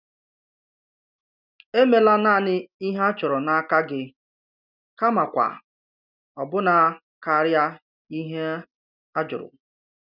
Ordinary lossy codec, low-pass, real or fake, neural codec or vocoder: none; 5.4 kHz; real; none